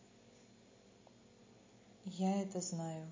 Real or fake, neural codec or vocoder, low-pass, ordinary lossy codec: real; none; 7.2 kHz; MP3, 32 kbps